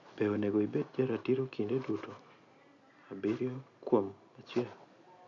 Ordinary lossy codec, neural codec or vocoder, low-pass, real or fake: AAC, 48 kbps; none; 7.2 kHz; real